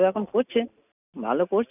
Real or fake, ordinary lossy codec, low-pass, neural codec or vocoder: real; none; 3.6 kHz; none